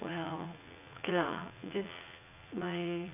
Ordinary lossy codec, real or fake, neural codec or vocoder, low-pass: none; fake; vocoder, 44.1 kHz, 80 mel bands, Vocos; 3.6 kHz